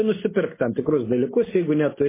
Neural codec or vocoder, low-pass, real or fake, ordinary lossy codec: none; 3.6 kHz; real; MP3, 16 kbps